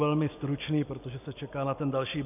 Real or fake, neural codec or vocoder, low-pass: real; none; 3.6 kHz